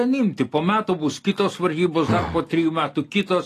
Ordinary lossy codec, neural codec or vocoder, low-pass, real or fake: AAC, 48 kbps; none; 14.4 kHz; real